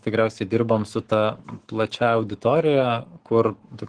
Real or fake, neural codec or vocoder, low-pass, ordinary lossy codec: fake; codec, 44.1 kHz, 7.8 kbps, Pupu-Codec; 9.9 kHz; Opus, 16 kbps